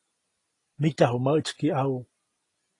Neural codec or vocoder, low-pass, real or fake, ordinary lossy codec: none; 10.8 kHz; real; AAC, 32 kbps